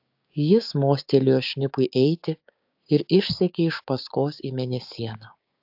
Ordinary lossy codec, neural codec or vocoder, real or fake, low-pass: AAC, 48 kbps; codec, 16 kHz, 6 kbps, DAC; fake; 5.4 kHz